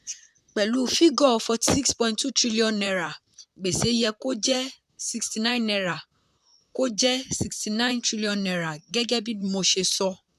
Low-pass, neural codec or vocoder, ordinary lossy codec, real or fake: 14.4 kHz; vocoder, 44.1 kHz, 128 mel bands, Pupu-Vocoder; AAC, 96 kbps; fake